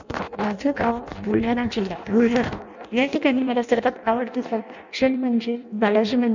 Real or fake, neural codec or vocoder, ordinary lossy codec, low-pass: fake; codec, 16 kHz in and 24 kHz out, 0.6 kbps, FireRedTTS-2 codec; none; 7.2 kHz